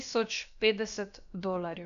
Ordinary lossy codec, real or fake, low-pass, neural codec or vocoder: none; fake; 7.2 kHz; codec, 16 kHz, about 1 kbps, DyCAST, with the encoder's durations